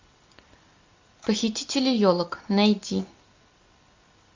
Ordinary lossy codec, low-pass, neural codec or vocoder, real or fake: MP3, 64 kbps; 7.2 kHz; none; real